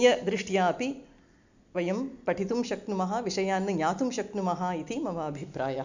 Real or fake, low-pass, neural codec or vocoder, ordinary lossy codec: real; 7.2 kHz; none; none